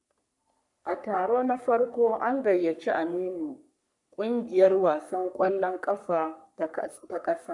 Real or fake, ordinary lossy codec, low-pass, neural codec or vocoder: fake; none; 10.8 kHz; codec, 44.1 kHz, 3.4 kbps, Pupu-Codec